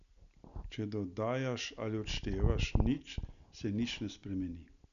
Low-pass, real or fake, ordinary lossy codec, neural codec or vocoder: 7.2 kHz; real; none; none